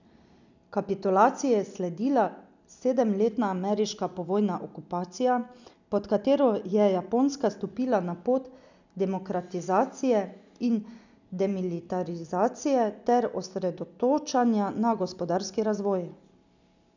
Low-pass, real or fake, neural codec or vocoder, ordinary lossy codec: 7.2 kHz; real; none; none